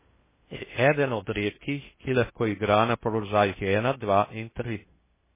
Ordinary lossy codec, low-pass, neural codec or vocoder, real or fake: MP3, 16 kbps; 3.6 kHz; codec, 16 kHz in and 24 kHz out, 0.6 kbps, FocalCodec, streaming, 2048 codes; fake